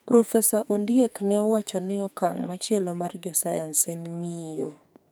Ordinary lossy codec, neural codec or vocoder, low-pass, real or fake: none; codec, 44.1 kHz, 2.6 kbps, SNAC; none; fake